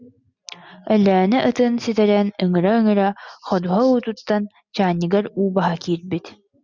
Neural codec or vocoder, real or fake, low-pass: none; real; 7.2 kHz